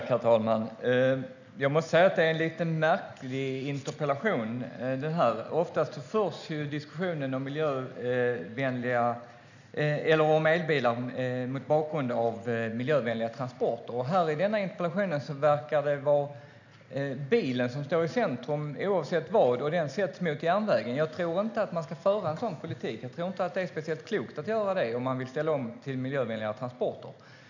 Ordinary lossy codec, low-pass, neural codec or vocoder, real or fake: none; 7.2 kHz; none; real